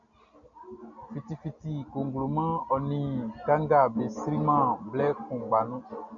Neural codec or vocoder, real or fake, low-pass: none; real; 7.2 kHz